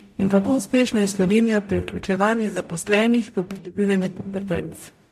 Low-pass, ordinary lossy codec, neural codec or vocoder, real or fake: 14.4 kHz; MP3, 64 kbps; codec, 44.1 kHz, 0.9 kbps, DAC; fake